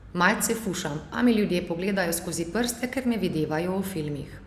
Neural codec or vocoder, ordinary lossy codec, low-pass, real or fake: none; Opus, 64 kbps; 14.4 kHz; real